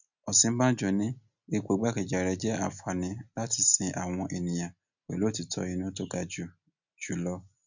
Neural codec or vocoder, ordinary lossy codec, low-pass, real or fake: none; none; 7.2 kHz; real